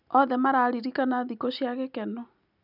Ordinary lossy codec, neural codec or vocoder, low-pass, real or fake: none; none; 5.4 kHz; real